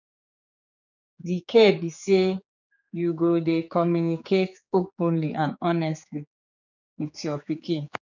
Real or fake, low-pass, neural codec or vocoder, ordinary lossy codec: fake; 7.2 kHz; codec, 16 kHz, 4 kbps, X-Codec, HuBERT features, trained on general audio; none